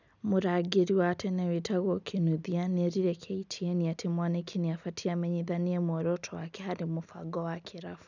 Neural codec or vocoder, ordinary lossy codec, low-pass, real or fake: none; none; 7.2 kHz; real